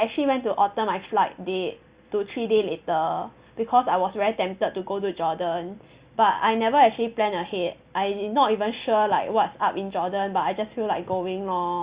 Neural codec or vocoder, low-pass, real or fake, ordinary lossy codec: none; 3.6 kHz; real; Opus, 64 kbps